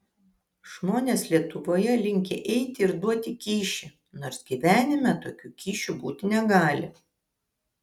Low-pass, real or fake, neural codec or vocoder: 19.8 kHz; real; none